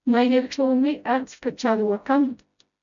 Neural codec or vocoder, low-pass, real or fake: codec, 16 kHz, 0.5 kbps, FreqCodec, smaller model; 7.2 kHz; fake